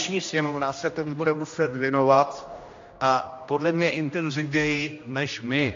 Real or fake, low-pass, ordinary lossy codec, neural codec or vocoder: fake; 7.2 kHz; AAC, 48 kbps; codec, 16 kHz, 1 kbps, X-Codec, HuBERT features, trained on general audio